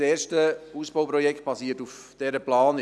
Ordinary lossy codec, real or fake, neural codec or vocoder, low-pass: none; real; none; none